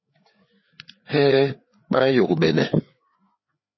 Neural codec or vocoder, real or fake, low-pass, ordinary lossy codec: codec, 16 kHz, 4 kbps, FreqCodec, larger model; fake; 7.2 kHz; MP3, 24 kbps